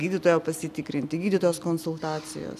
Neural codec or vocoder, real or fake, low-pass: none; real; 14.4 kHz